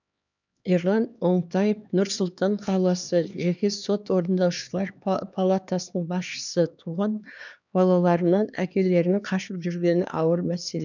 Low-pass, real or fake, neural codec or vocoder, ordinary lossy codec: 7.2 kHz; fake; codec, 16 kHz, 2 kbps, X-Codec, HuBERT features, trained on LibriSpeech; none